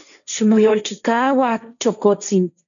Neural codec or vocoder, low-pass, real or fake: codec, 16 kHz, 1.1 kbps, Voila-Tokenizer; 7.2 kHz; fake